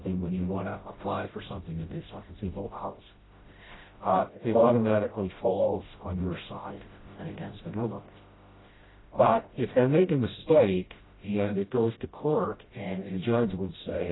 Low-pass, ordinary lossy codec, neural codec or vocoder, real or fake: 7.2 kHz; AAC, 16 kbps; codec, 16 kHz, 0.5 kbps, FreqCodec, smaller model; fake